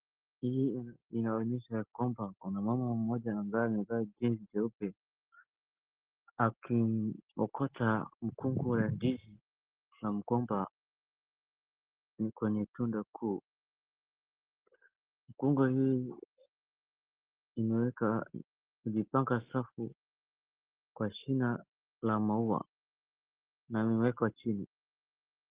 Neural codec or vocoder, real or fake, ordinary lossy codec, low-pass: none; real; Opus, 16 kbps; 3.6 kHz